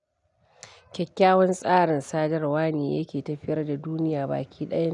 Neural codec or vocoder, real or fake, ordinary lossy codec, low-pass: none; real; none; 10.8 kHz